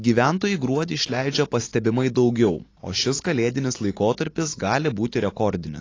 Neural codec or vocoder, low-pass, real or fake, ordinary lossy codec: none; 7.2 kHz; real; AAC, 32 kbps